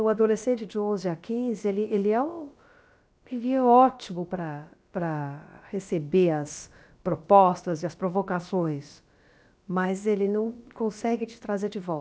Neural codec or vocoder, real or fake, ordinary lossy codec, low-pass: codec, 16 kHz, about 1 kbps, DyCAST, with the encoder's durations; fake; none; none